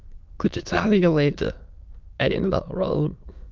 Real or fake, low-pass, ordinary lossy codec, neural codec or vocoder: fake; 7.2 kHz; Opus, 24 kbps; autoencoder, 22.05 kHz, a latent of 192 numbers a frame, VITS, trained on many speakers